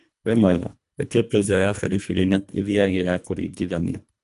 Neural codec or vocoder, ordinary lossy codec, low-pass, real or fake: codec, 24 kHz, 1.5 kbps, HILCodec; none; 10.8 kHz; fake